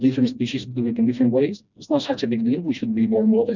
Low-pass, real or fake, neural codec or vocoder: 7.2 kHz; fake; codec, 16 kHz, 1 kbps, FreqCodec, smaller model